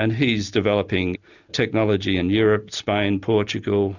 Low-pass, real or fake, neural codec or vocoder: 7.2 kHz; real; none